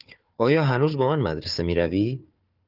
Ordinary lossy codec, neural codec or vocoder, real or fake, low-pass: Opus, 24 kbps; codec, 16 kHz, 4 kbps, FunCodec, trained on Chinese and English, 50 frames a second; fake; 5.4 kHz